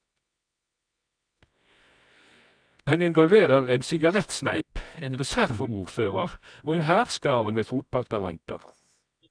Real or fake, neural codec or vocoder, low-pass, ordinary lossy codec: fake; codec, 24 kHz, 0.9 kbps, WavTokenizer, medium music audio release; 9.9 kHz; none